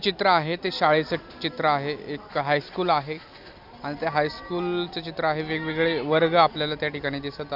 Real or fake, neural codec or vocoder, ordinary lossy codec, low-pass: real; none; none; 5.4 kHz